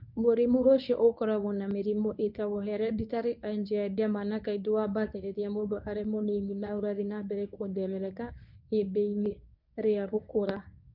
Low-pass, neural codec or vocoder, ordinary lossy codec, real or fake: 5.4 kHz; codec, 24 kHz, 0.9 kbps, WavTokenizer, medium speech release version 1; none; fake